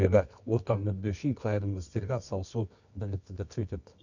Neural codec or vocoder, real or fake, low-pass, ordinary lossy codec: codec, 24 kHz, 0.9 kbps, WavTokenizer, medium music audio release; fake; 7.2 kHz; none